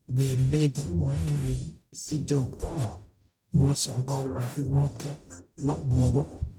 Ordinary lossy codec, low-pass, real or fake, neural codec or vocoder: none; 19.8 kHz; fake; codec, 44.1 kHz, 0.9 kbps, DAC